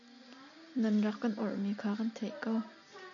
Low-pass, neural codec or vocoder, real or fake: 7.2 kHz; none; real